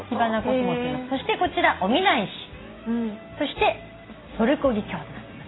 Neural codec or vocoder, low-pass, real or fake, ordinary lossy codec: none; 7.2 kHz; real; AAC, 16 kbps